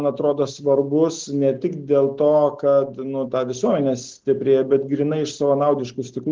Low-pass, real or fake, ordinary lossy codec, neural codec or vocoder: 7.2 kHz; real; Opus, 16 kbps; none